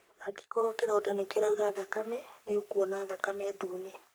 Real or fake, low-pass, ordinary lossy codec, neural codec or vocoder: fake; none; none; codec, 44.1 kHz, 3.4 kbps, Pupu-Codec